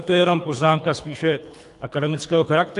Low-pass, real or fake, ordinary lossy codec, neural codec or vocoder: 10.8 kHz; fake; AAC, 64 kbps; codec, 24 kHz, 3 kbps, HILCodec